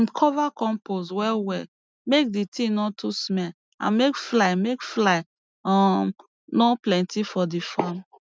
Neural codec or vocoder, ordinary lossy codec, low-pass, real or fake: none; none; none; real